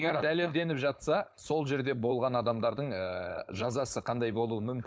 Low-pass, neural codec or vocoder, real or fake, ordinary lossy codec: none; codec, 16 kHz, 4.8 kbps, FACodec; fake; none